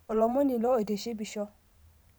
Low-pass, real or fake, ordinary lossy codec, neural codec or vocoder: none; fake; none; vocoder, 44.1 kHz, 128 mel bands every 512 samples, BigVGAN v2